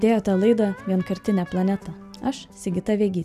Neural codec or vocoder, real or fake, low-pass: none; real; 14.4 kHz